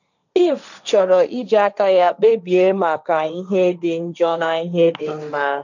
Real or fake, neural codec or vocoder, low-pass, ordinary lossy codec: fake; codec, 16 kHz, 1.1 kbps, Voila-Tokenizer; 7.2 kHz; none